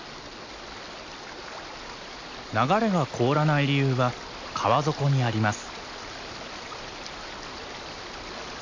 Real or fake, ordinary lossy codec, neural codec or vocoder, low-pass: real; AAC, 48 kbps; none; 7.2 kHz